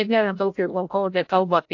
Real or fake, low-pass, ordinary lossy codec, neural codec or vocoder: fake; 7.2 kHz; AAC, 48 kbps; codec, 16 kHz, 0.5 kbps, FreqCodec, larger model